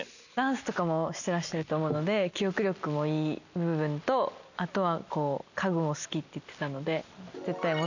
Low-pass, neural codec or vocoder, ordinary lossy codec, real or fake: 7.2 kHz; none; none; real